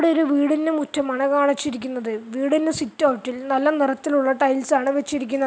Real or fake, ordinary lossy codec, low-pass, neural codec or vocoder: real; none; none; none